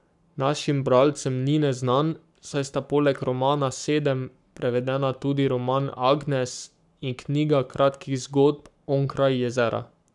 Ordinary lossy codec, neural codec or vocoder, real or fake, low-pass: none; codec, 44.1 kHz, 7.8 kbps, Pupu-Codec; fake; 10.8 kHz